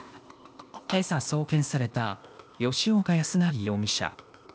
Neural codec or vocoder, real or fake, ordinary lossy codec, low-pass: codec, 16 kHz, 0.8 kbps, ZipCodec; fake; none; none